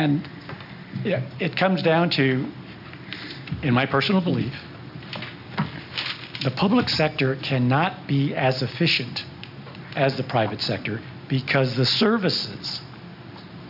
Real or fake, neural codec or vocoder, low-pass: real; none; 5.4 kHz